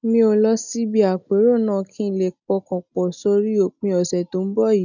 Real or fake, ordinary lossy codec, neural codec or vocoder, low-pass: real; none; none; 7.2 kHz